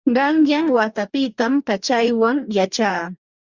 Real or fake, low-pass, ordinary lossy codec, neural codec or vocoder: fake; 7.2 kHz; Opus, 64 kbps; codec, 44.1 kHz, 2.6 kbps, DAC